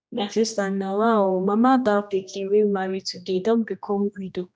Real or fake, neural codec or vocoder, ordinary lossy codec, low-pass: fake; codec, 16 kHz, 1 kbps, X-Codec, HuBERT features, trained on general audio; none; none